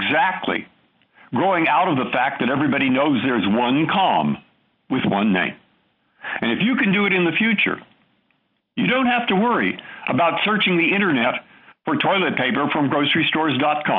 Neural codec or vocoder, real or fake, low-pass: none; real; 5.4 kHz